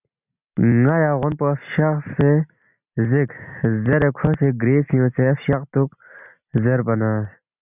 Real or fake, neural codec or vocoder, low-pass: real; none; 3.6 kHz